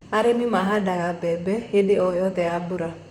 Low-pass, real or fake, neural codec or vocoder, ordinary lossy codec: 19.8 kHz; fake; vocoder, 44.1 kHz, 128 mel bands, Pupu-Vocoder; none